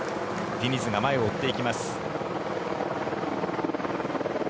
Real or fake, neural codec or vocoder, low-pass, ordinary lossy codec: real; none; none; none